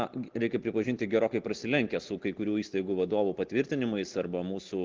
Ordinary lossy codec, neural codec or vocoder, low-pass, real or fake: Opus, 16 kbps; none; 7.2 kHz; real